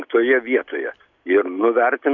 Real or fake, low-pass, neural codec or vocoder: real; 7.2 kHz; none